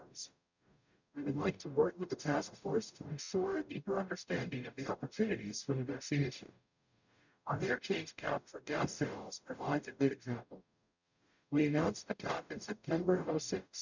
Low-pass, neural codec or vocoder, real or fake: 7.2 kHz; codec, 44.1 kHz, 0.9 kbps, DAC; fake